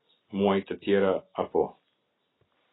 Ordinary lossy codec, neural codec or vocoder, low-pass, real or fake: AAC, 16 kbps; none; 7.2 kHz; real